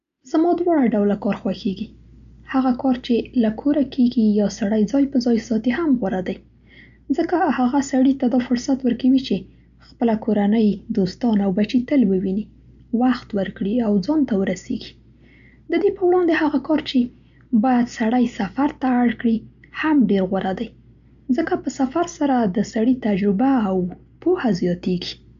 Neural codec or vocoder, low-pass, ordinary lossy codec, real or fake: none; 7.2 kHz; none; real